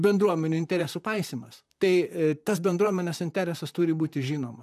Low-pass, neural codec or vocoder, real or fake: 14.4 kHz; vocoder, 44.1 kHz, 128 mel bands, Pupu-Vocoder; fake